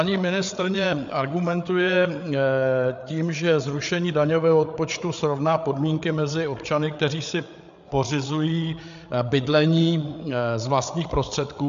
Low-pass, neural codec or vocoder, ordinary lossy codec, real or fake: 7.2 kHz; codec, 16 kHz, 8 kbps, FreqCodec, larger model; MP3, 64 kbps; fake